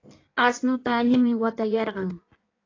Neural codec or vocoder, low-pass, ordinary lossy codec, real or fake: codec, 16 kHz in and 24 kHz out, 1.1 kbps, FireRedTTS-2 codec; 7.2 kHz; AAC, 32 kbps; fake